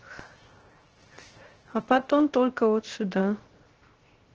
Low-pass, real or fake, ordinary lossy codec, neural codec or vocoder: 7.2 kHz; fake; Opus, 16 kbps; codec, 16 kHz, 0.3 kbps, FocalCodec